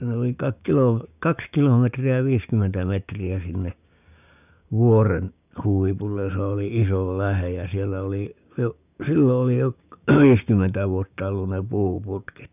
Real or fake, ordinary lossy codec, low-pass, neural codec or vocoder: fake; none; 3.6 kHz; codec, 16 kHz, 6 kbps, DAC